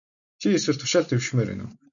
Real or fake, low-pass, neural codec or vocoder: real; 7.2 kHz; none